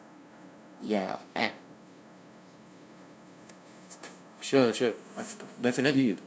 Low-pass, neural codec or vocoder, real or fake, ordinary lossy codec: none; codec, 16 kHz, 0.5 kbps, FunCodec, trained on LibriTTS, 25 frames a second; fake; none